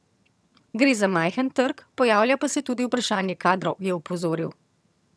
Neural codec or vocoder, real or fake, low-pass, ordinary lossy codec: vocoder, 22.05 kHz, 80 mel bands, HiFi-GAN; fake; none; none